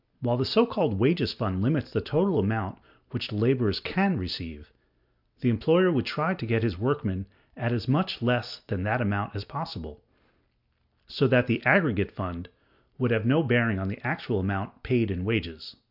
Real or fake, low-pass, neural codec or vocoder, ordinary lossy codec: real; 5.4 kHz; none; MP3, 48 kbps